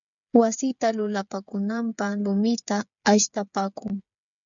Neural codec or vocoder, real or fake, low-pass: codec, 16 kHz, 8 kbps, FreqCodec, smaller model; fake; 7.2 kHz